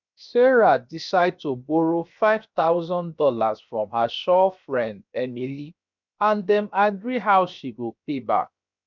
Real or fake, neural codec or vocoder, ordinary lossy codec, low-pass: fake; codec, 16 kHz, 0.7 kbps, FocalCodec; none; 7.2 kHz